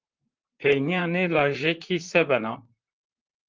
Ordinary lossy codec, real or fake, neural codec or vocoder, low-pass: Opus, 32 kbps; fake; vocoder, 44.1 kHz, 128 mel bands, Pupu-Vocoder; 7.2 kHz